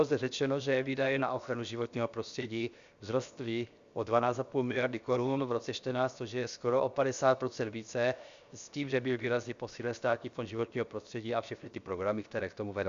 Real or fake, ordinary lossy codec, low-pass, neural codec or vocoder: fake; Opus, 64 kbps; 7.2 kHz; codec, 16 kHz, 0.7 kbps, FocalCodec